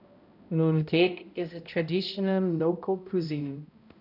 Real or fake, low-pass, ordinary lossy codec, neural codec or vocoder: fake; 5.4 kHz; none; codec, 16 kHz, 0.5 kbps, X-Codec, HuBERT features, trained on balanced general audio